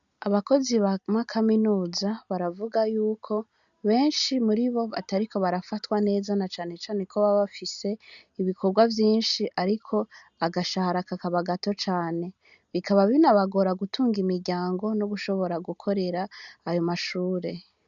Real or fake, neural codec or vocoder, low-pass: real; none; 7.2 kHz